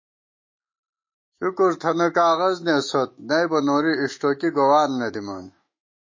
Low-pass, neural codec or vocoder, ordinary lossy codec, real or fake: 7.2 kHz; autoencoder, 48 kHz, 128 numbers a frame, DAC-VAE, trained on Japanese speech; MP3, 32 kbps; fake